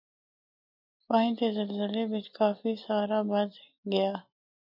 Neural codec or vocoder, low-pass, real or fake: none; 5.4 kHz; real